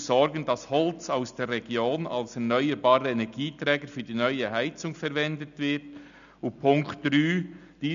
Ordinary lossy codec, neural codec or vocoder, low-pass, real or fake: none; none; 7.2 kHz; real